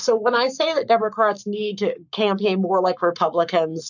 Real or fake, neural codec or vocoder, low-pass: fake; codec, 44.1 kHz, 7.8 kbps, Pupu-Codec; 7.2 kHz